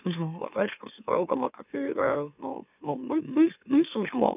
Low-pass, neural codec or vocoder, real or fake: 3.6 kHz; autoencoder, 44.1 kHz, a latent of 192 numbers a frame, MeloTTS; fake